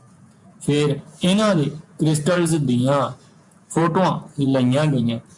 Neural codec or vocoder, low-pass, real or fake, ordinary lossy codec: vocoder, 48 kHz, 128 mel bands, Vocos; 10.8 kHz; fake; MP3, 96 kbps